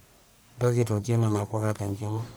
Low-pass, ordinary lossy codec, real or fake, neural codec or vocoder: none; none; fake; codec, 44.1 kHz, 1.7 kbps, Pupu-Codec